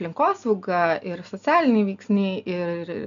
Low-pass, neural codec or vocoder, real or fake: 7.2 kHz; none; real